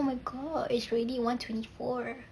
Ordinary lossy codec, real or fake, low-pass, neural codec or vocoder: none; real; none; none